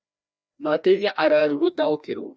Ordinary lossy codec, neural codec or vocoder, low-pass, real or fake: none; codec, 16 kHz, 1 kbps, FreqCodec, larger model; none; fake